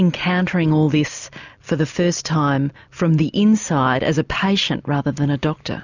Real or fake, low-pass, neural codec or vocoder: real; 7.2 kHz; none